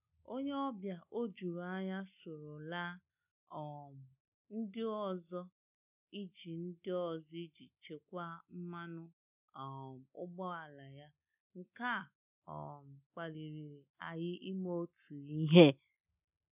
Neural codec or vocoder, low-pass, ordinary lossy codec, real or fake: none; 3.6 kHz; none; real